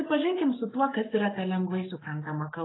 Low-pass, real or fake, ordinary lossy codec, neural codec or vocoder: 7.2 kHz; fake; AAC, 16 kbps; codec, 44.1 kHz, 7.8 kbps, Pupu-Codec